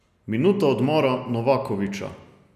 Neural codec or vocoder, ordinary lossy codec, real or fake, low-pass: none; none; real; 14.4 kHz